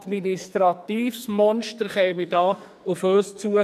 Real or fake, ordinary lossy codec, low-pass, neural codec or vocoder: fake; none; 14.4 kHz; codec, 44.1 kHz, 2.6 kbps, SNAC